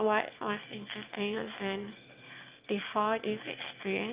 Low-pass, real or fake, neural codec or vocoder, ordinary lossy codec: 3.6 kHz; fake; autoencoder, 22.05 kHz, a latent of 192 numbers a frame, VITS, trained on one speaker; Opus, 24 kbps